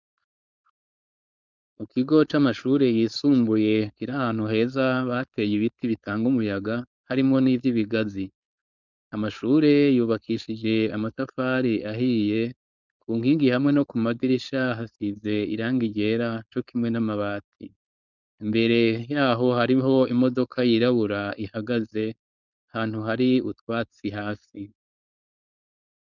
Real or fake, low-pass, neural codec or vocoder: fake; 7.2 kHz; codec, 16 kHz, 4.8 kbps, FACodec